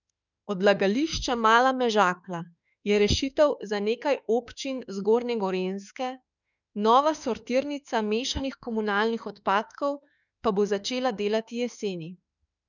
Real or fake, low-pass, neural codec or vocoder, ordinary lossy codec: fake; 7.2 kHz; autoencoder, 48 kHz, 32 numbers a frame, DAC-VAE, trained on Japanese speech; none